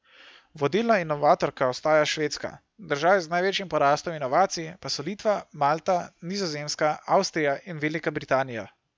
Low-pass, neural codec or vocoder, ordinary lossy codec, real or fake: none; none; none; real